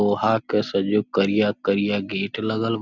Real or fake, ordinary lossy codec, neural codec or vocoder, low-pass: real; none; none; 7.2 kHz